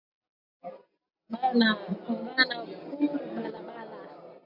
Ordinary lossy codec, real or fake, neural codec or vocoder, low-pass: MP3, 48 kbps; real; none; 5.4 kHz